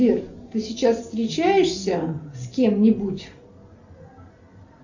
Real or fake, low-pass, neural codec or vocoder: real; 7.2 kHz; none